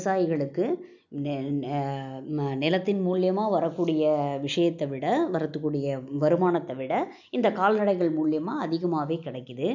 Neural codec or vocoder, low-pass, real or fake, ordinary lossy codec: none; 7.2 kHz; real; none